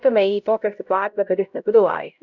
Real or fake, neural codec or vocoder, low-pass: fake; codec, 16 kHz, 0.5 kbps, X-Codec, HuBERT features, trained on LibriSpeech; 7.2 kHz